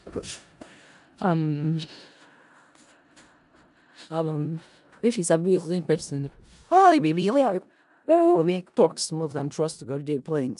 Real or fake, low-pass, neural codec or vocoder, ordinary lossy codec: fake; 10.8 kHz; codec, 16 kHz in and 24 kHz out, 0.4 kbps, LongCat-Audio-Codec, four codebook decoder; AAC, 96 kbps